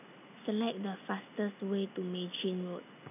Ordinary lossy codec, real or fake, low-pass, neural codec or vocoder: none; real; 3.6 kHz; none